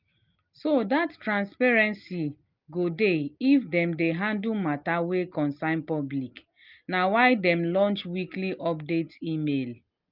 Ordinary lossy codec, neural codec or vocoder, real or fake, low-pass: Opus, 32 kbps; none; real; 5.4 kHz